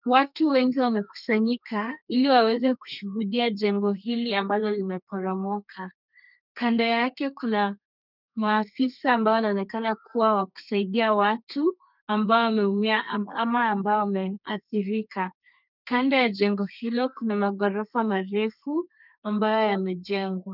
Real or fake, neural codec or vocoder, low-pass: fake; codec, 32 kHz, 1.9 kbps, SNAC; 5.4 kHz